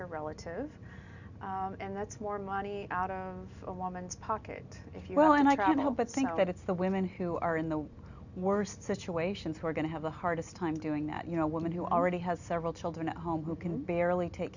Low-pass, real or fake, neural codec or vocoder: 7.2 kHz; real; none